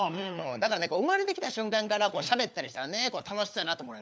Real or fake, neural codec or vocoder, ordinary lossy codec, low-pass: fake; codec, 16 kHz, 4 kbps, FunCodec, trained on LibriTTS, 50 frames a second; none; none